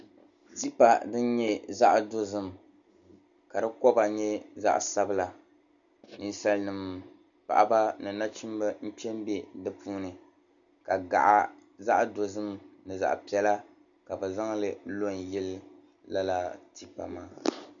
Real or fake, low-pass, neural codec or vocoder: real; 7.2 kHz; none